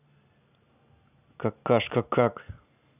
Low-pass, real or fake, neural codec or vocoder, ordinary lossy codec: 3.6 kHz; real; none; none